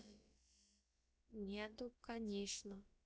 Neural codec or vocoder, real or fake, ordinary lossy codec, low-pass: codec, 16 kHz, about 1 kbps, DyCAST, with the encoder's durations; fake; none; none